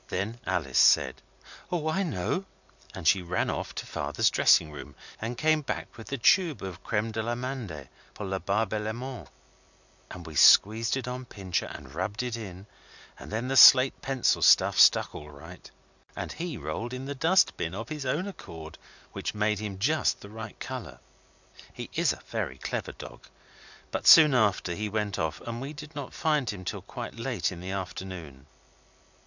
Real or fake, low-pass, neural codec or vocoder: real; 7.2 kHz; none